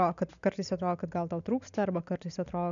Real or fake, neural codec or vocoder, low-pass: real; none; 7.2 kHz